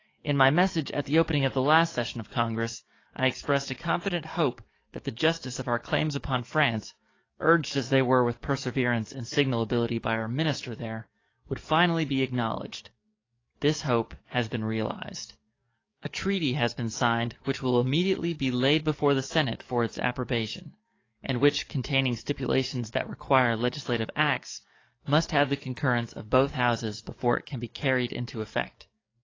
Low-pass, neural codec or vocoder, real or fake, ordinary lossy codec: 7.2 kHz; codec, 44.1 kHz, 7.8 kbps, DAC; fake; AAC, 32 kbps